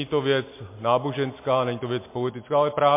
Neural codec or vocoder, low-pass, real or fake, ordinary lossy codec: none; 3.6 kHz; real; MP3, 24 kbps